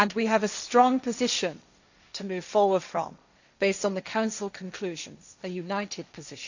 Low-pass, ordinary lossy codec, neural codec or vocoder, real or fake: 7.2 kHz; none; codec, 16 kHz, 1.1 kbps, Voila-Tokenizer; fake